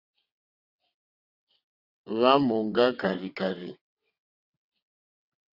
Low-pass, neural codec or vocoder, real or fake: 5.4 kHz; vocoder, 22.05 kHz, 80 mel bands, WaveNeXt; fake